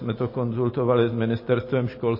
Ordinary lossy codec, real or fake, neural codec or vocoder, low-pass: MP3, 24 kbps; real; none; 5.4 kHz